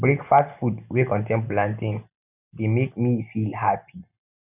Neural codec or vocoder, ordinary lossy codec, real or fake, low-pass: none; none; real; 3.6 kHz